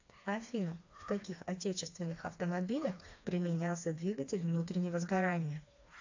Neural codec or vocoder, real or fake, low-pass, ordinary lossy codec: codec, 16 kHz, 2 kbps, FreqCodec, smaller model; fake; 7.2 kHz; AAC, 48 kbps